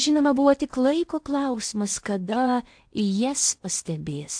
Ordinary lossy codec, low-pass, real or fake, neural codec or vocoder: AAC, 64 kbps; 9.9 kHz; fake; codec, 16 kHz in and 24 kHz out, 0.8 kbps, FocalCodec, streaming, 65536 codes